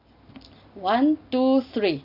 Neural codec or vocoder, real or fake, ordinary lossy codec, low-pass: none; real; none; 5.4 kHz